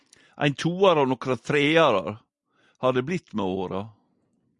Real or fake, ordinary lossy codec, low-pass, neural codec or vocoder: real; Opus, 64 kbps; 10.8 kHz; none